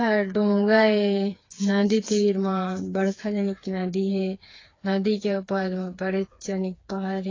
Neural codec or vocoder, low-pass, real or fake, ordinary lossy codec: codec, 16 kHz, 4 kbps, FreqCodec, smaller model; 7.2 kHz; fake; AAC, 32 kbps